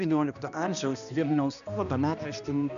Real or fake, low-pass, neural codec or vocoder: fake; 7.2 kHz; codec, 16 kHz, 1 kbps, X-Codec, HuBERT features, trained on balanced general audio